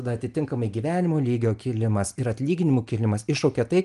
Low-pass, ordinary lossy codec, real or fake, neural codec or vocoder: 10.8 kHz; Opus, 32 kbps; real; none